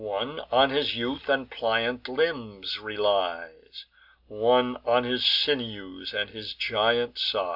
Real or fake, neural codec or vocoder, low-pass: real; none; 5.4 kHz